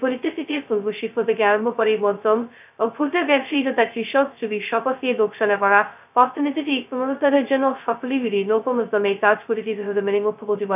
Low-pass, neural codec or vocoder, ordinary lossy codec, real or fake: 3.6 kHz; codec, 16 kHz, 0.2 kbps, FocalCodec; none; fake